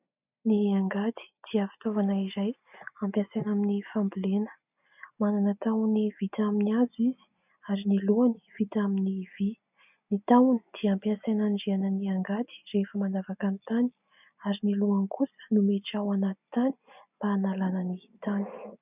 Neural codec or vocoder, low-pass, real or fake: none; 3.6 kHz; real